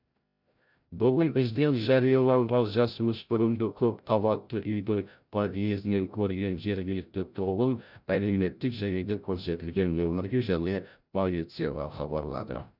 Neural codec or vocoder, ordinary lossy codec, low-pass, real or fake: codec, 16 kHz, 0.5 kbps, FreqCodec, larger model; none; 5.4 kHz; fake